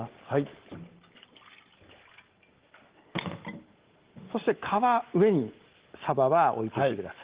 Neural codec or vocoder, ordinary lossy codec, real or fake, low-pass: none; Opus, 16 kbps; real; 3.6 kHz